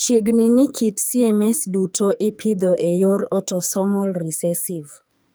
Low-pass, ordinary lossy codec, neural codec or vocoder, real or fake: none; none; codec, 44.1 kHz, 2.6 kbps, SNAC; fake